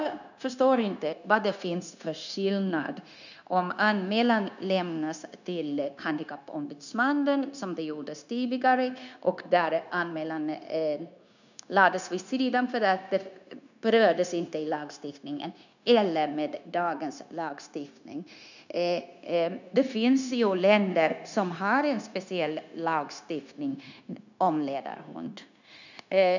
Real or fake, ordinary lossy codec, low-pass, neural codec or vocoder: fake; none; 7.2 kHz; codec, 16 kHz, 0.9 kbps, LongCat-Audio-Codec